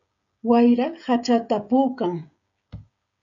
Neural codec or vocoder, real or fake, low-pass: codec, 16 kHz, 16 kbps, FreqCodec, smaller model; fake; 7.2 kHz